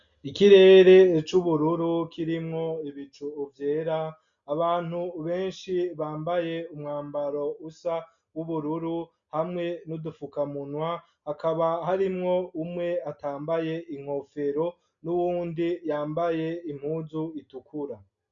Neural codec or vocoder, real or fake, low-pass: none; real; 7.2 kHz